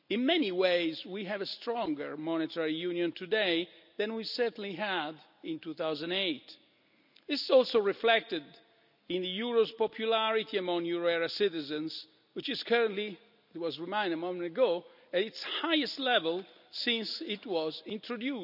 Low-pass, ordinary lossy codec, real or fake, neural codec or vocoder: 5.4 kHz; none; real; none